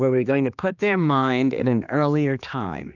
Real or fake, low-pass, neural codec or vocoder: fake; 7.2 kHz; codec, 16 kHz, 2 kbps, X-Codec, HuBERT features, trained on general audio